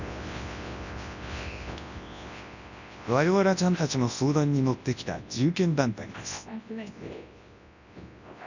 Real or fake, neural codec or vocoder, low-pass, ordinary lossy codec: fake; codec, 24 kHz, 0.9 kbps, WavTokenizer, large speech release; 7.2 kHz; none